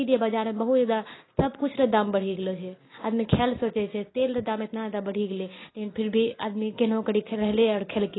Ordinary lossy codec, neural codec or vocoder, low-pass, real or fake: AAC, 16 kbps; none; 7.2 kHz; real